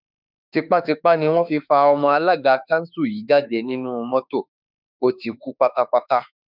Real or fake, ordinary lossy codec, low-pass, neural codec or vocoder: fake; none; 5.4 kHz; autoencoder, 48 kHz, 32 numbers a frame, DAC-VAE, trained on Japanese speech